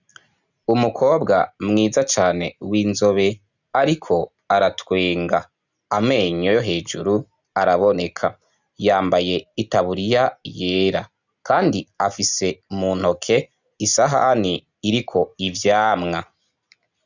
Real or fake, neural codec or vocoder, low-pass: real; none; 7.2 kHz